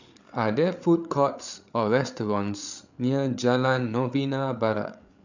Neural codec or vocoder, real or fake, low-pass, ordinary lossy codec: codec, 16 kHz, 8 kbps, FreqCodec, larger model; fake; 7.2 kHz; none